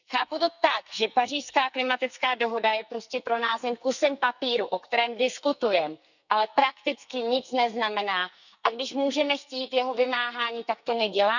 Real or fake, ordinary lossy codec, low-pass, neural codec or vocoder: fake; none; 7.2 kHz; codec, 44.1 kHz, 2.6 kbps, SNAC